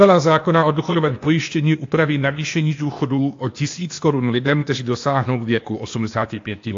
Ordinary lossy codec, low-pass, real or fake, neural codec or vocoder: AAC, 48 kbps; 7.2 kHz; fake; codec, 16 kHz, 0.8 kbps, ZipCodec